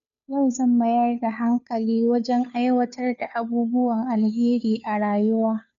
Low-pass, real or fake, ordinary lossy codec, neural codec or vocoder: 7.2 kHz; fake; none; codec, 16 kHz, 2 kbps, FunCodec, trained on Chinese and English, 25 frames a second